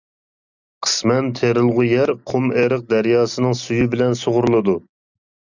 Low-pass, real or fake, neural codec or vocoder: 7.2 kHz; real; none